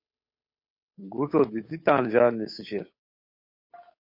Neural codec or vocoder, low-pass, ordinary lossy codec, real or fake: codec, 16 kHz, 8 kbps, FunCodec, trained on Chinese and English, 25 frames a second; 5.4 kHz; MP3, 32 kbps; fake